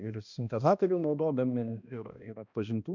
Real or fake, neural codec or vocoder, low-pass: fake; codec, 16 kHz, 1 kbps, X-Codec, HuBERT features, trained on balanced general audio; 7.2 kHz